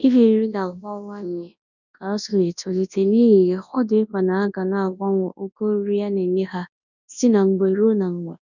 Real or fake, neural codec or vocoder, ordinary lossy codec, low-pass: fake; codec, 24 kHz, 0.9 kbps, WavTokenizer, large speech release; none; 7.2 kHz